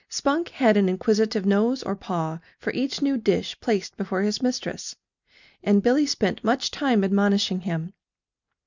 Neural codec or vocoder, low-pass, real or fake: none; 7.2 kHz; real